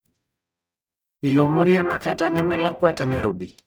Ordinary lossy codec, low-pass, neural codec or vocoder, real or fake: none; none; codec, 44.1 kHz, 0.9 kbps, DAC; fake